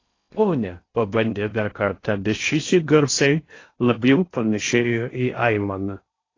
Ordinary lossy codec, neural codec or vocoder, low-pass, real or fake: AAC, 32 kbps; codec, 16 kHz in and 24 kHz out, 0.6 kbps, FocalCodec, streaming, 4096 codes; 7.2 kHz; fake